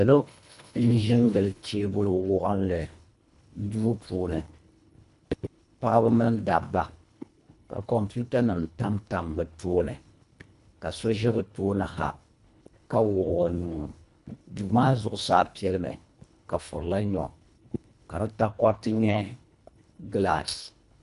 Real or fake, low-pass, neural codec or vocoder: fake; 10.8 kHz; codec, 24 kHz, 1.5 kbps, HILCodec